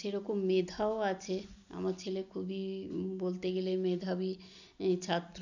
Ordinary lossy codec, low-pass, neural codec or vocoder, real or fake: none; 7.2 kHz; none; real